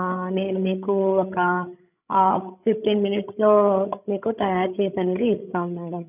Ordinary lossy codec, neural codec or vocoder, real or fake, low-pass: none; codec, 16 kHz, 16 kbps, FreqCodec, larger model; fake; 3.6 kHz